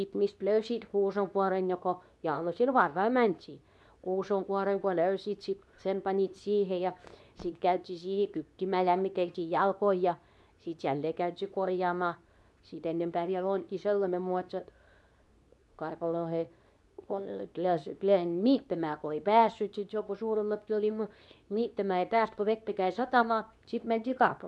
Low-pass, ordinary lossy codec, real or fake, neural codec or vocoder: none; none; fake; codec, 24 kHz, 0.9 kbps, WavTokenizer, medium speech release version 2